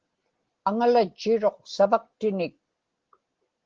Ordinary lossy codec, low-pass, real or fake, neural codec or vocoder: Opus, 16 kbps; 7.2 kHz; real; none